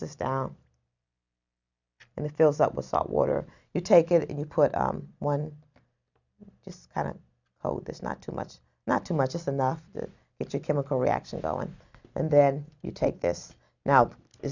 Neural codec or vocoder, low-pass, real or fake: none; 7.2 kHz; real